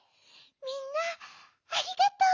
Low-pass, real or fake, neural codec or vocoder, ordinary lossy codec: 7.2 kHz; real; none; none